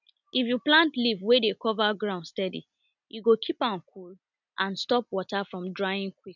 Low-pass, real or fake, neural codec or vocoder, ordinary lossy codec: 7.2 kHz; real; none; none